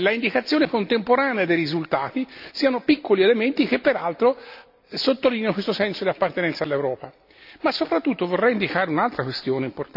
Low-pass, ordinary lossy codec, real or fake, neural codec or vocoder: 5.4 kHz; AAC, 48 kbps; real; none